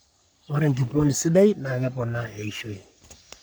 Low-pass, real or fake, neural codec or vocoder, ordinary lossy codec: none; fake; codec, 44.1 kHz, 3.4 kbps, Pupu-Codec; none